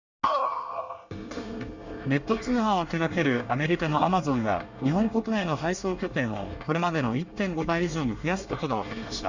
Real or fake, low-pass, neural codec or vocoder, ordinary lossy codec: fake; 7.2 kHz; codec, 24 kHz, 1 kbps, SNAC; Opus, 64 kbps